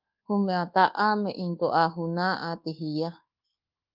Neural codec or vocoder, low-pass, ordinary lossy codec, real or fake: codec, 24 kHz, 1.2 kbps, DualCodec; 5.4 kHz; Opus, 32 kbps; fake